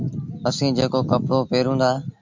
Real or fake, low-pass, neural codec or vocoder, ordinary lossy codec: real; 7.2 kHz; none; MP3, 64 kbps